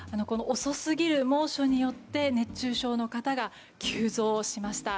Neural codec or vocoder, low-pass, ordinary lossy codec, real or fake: none; none; none; real